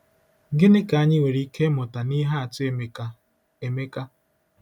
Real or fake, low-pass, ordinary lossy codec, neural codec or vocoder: real; 19.8 kHz; none; none